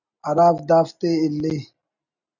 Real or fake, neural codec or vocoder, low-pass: real; none; 7.2 kHz